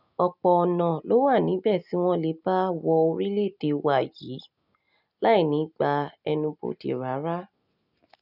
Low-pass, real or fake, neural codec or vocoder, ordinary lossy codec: 5.4 kHz; real; none; none